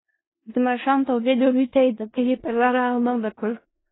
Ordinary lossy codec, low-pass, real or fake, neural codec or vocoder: AAC, 16 kbps; 7.2 kHz; fake; codec, 16 kHz in and 24 kHz out, 0.4 kbps, LongCat-Audio-Codec, four codebook decoder